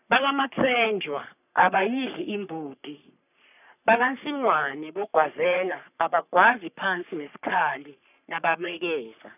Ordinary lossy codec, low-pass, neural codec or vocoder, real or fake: none; 3.6 kHz; codec, 44.1 kHz, 3.4 kbps, Pupu-Codec; fake